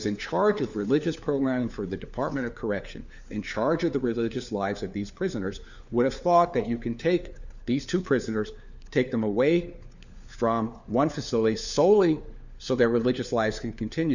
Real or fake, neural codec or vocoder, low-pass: fake; codec, 16 kHz, 4 kbps, FunCodec, trained on LibriTTS, 50 frames a second; 7.2 kHz